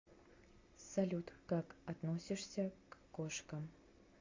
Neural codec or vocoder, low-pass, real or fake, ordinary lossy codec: none; 7.2 kHz; real; MP3, 48 kbps